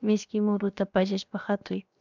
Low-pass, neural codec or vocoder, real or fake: 7.2 kHz; codec, 16 kHz, 0.7 kbps, FocalCodec; fake